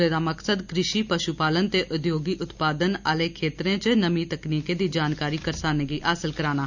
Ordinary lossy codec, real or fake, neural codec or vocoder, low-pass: none; real; none; 7.2 kHz